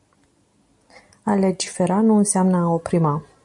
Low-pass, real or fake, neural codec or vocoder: 10.8 kHz; real; none